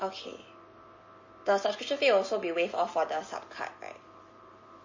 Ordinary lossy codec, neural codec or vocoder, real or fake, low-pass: MP3, 32 kbps; none; real; 7.2 kHz